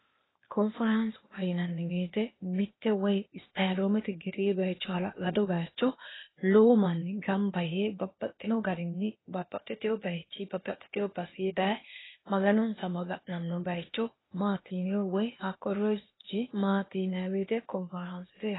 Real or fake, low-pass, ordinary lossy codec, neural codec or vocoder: fake; 7.2 kHz; AAC, 16 kbps; codec, 16 kHz, 0.8 kbps, ZipCodec